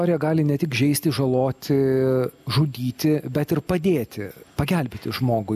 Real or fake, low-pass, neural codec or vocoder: real; 14.4 kHz; none